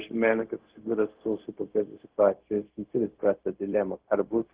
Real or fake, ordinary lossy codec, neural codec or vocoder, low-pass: fake; Opus, 16 kbps; codec, 16 kHz, 0.4 kbps, LongCat-Audio-Codec; 3.6 kHz